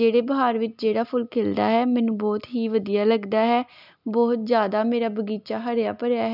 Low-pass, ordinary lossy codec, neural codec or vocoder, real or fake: 5.4 kHz; none; none; real